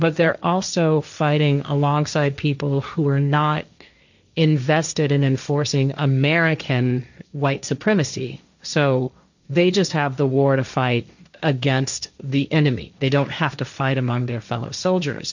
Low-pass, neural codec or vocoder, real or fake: 7.2 kHz; codec, 16 kHz, 1.1 kbps, Voila-Tokenizer; fake